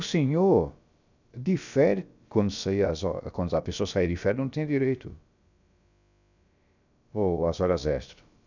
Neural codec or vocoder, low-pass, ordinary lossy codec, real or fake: codec, 16 kHz, about 1 kbps, DyCAST, with the encoder's durations; 7.2 kHz; none; fake